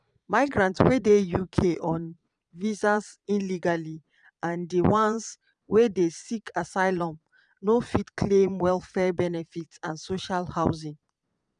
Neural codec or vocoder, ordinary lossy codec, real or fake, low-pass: vocoder, 22.05 kHz, 80 mel bands, Vocos; none; fake; 9.9 kHz